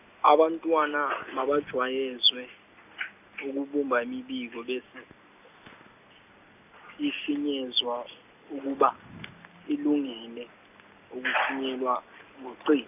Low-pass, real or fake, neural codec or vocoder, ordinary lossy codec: 3.6 kHz; real; none; none